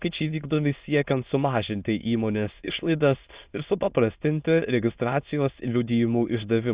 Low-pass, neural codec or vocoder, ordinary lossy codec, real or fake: 3.6 kHz; autoencoder, 22.05 kHz, a latent of 192 numbers a frame, VITS, trained on many speakers; Opus, 64 kbps; fake